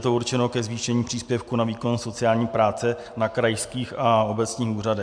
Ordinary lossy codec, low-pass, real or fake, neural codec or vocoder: MP3, 64 kbps; 9.9 kHz; real; none